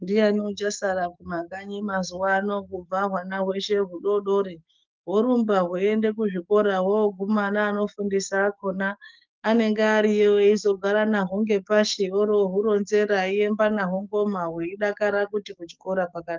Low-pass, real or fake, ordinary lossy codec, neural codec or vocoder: 7.2 kHz; fake; Opus, 24 kbps; codec, 44.1 kHz, 7.8 kbps, DAC